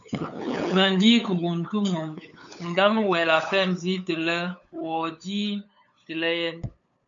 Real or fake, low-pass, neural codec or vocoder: fake; 7.2 kHz; codec, 16 kHz, 8 kbps, FunCodec, trained on LibriTTS, 25 frames a second